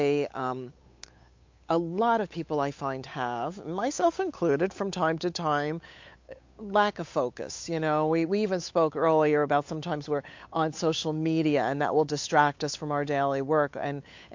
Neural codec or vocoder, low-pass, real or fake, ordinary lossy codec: codec, 16 kHz, 8 kbps, FunCodec, trained on Chinese and English, 25 frames a second; 7.2 kHz; fake; MP3, 48 kbps